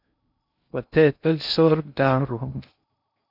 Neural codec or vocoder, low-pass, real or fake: codec, 16 kHz in and 24 kHz out, 0.6 kbps, FocalCodec, streaming, 2048 codes; 5.4 kHz; fake